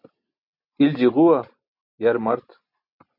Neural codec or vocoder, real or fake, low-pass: none; real; 5.4 kHz